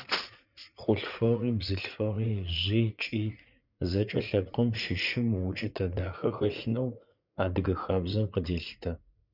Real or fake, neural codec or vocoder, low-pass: fake; vocoder, 44.1 kHz, 80 mel bands, Vocos; 5.4 kHz